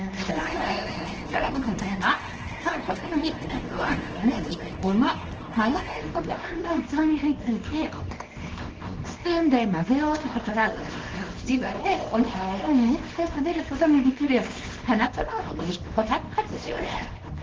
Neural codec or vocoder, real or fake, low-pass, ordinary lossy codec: codec, 24 kHz, 0.9 kbps, WavTokenizer, small release; fake; 7.2 kHz; Opus, 16 kbps